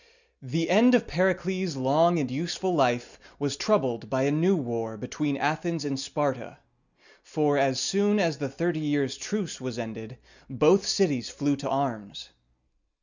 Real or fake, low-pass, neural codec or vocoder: real; 7.2 kHz; none